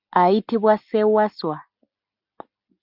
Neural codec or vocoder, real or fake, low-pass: none; real; 5.4 kHz